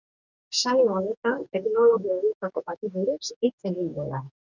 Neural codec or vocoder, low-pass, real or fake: codec, 44.1 kHz, 2.6 kbps, DAC; 7.2 kHz; fake